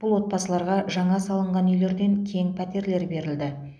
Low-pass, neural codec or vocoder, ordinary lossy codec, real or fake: none; none; none; real